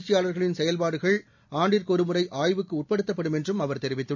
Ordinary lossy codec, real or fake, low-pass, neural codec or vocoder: none; real; 7.2 kHz; none